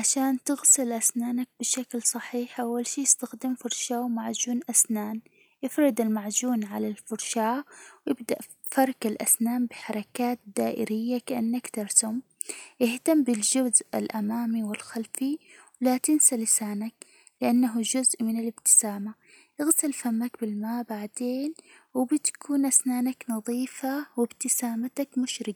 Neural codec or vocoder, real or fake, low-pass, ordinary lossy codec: none; real; none; none